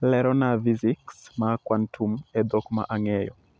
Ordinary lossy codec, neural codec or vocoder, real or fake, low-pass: none; none; real; none